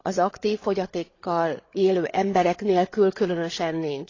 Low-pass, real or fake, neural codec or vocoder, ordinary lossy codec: 7.2 kHz; fake; codec, 16 kHz, 8 kbps, FreqCodec, larger model; AAC, 32 kbps